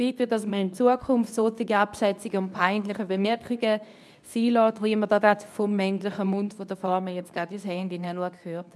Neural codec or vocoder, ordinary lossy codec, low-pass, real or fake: codec, 24 kHz, 0.9 kbps, WavTokenizer, medium speech release version 2; none; none; fake